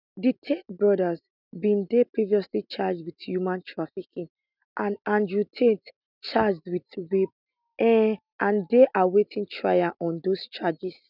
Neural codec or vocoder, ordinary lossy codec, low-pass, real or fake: none; none; 5.4 kHz; real